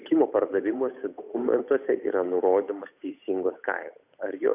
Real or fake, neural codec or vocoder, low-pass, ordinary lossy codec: fake; codec, 16 kHz, 8 kbps, FunCodec, trained on Chinese and English, 25 frames a second; 3.6 kHz; AAC, 32 kbps